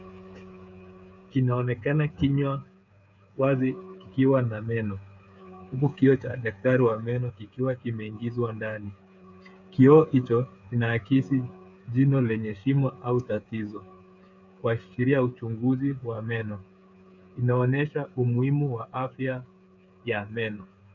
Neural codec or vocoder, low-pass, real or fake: codec, 16 kHz, 8 kbps, FreqCodec, smaller model; 7.2 kHz; fake